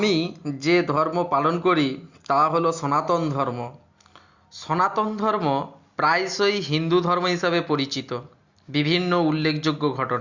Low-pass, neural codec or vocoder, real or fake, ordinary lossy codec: 7.2 kHz; none; real; Opus, 64 kbps